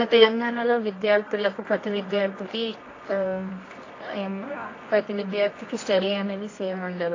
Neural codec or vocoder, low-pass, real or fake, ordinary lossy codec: codec, 24 kHz, 0.9 kbps, WavTokenizer, medium music audio release; 7.2 kHz; fake; AAC, 32 kbps